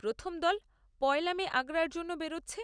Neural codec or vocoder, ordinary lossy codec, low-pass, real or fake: none; none; 9.9 kHz; real